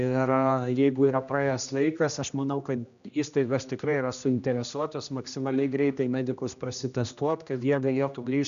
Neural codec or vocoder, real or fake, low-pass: codec, 16 kHz, 1 kbps, X-Codec, HuBERT features, trained on general audio; fake; 7.2 kHz